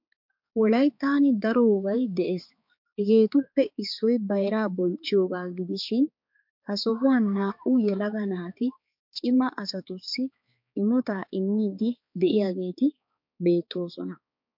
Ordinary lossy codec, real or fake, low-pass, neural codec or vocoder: MP3, 48 kbps; fake; 5.4 kHz; codec, 16 kHz, 4 kbps, X-Codec, HuBERT features, trained on balanced general audio